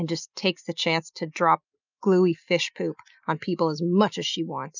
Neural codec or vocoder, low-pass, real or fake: none; 7.2 kHz; real